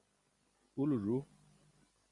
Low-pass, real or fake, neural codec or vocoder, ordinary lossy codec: 10.8 kHz; real; none; Opus, 64 kbps